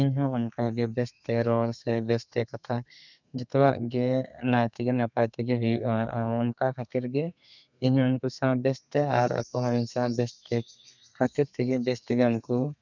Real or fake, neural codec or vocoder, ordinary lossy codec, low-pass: fake; codec, 32 kHz, 1.9 kbps, SNAC; none; 7.2 kHz